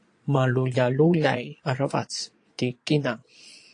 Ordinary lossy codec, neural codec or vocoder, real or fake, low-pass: AAC, 48 kbps; vocoder, 22.05 kHz, 80 mel bands, Vocos; fake; 9.9 kHz